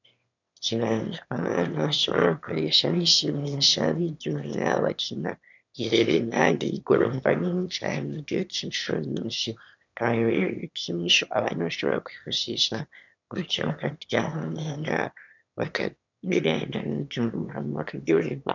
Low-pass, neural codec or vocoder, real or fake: 7.2 kHz; autoencoder, 22.05 kHz, a latent of 192 numbers a frame, VITS, trained on one speaker; fake